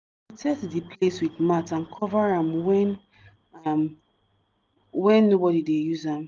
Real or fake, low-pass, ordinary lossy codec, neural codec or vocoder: real; 7.2 kHz; Opus, 32 kbps; none